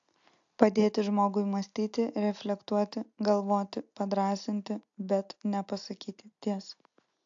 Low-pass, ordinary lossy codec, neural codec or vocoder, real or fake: 7.2 kHz; AAC, 64 kbps; none; real